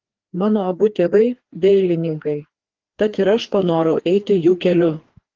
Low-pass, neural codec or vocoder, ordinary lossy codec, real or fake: 7.2 kHz; codec, 16 kHz, 2 kbps, FreqCodec, larger model; Opus, 16 kbps; fake